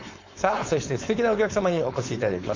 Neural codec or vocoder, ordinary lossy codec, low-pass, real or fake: codec, 16 kHz, 4.8 kbps, FACodec; MP3, 64 kbps; 7.2 kHz; fake